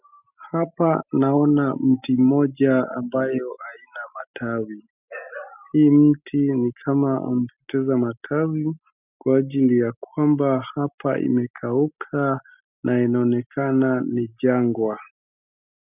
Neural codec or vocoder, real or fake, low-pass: none; real; 3.6 kHz